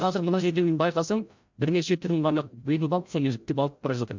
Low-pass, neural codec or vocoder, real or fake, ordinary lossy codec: 7.2 kHz; codec, 16 kHz, 0.5 kbps, FreqCodec, larger model; fake; MP3, 64 kbps